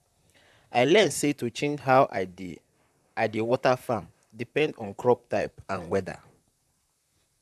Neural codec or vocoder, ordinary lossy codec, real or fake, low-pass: vocoder, 44.1 kHz, 128 mel bands, Pupu-Vocoder; none; fake; 14.4 kHz